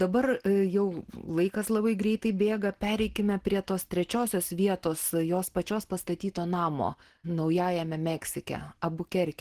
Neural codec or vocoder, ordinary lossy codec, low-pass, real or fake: none; Opus, 16 kbps; 14.4 kHz; real